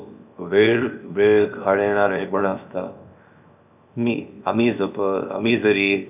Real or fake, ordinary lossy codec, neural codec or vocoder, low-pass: fake; none; codec, 16 kHz, 0.7 kbps, FocalCodec; 3.6 kHz